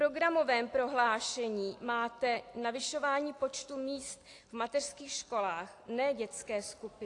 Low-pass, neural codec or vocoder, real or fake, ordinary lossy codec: 10.8 kHz; none; real; AAC, 48 kbps